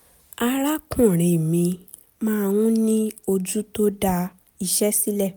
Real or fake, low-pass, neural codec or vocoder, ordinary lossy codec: real; none; none; none